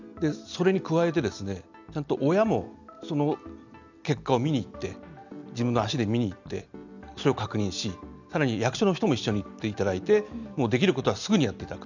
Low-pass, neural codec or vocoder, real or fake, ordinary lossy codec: 7.2 kHz; none; real; none